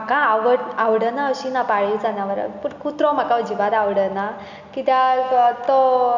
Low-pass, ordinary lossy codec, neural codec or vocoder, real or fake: 7.2 kHz; none; none; real